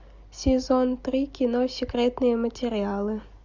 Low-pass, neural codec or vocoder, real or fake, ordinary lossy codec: 7.2 kHz; none; real; Opus, 64 kbps